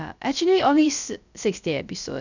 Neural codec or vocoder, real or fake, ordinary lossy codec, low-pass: codec, 16 kHz, 0.3 kbps, FocalCodec; fake; none; 7.2 kHz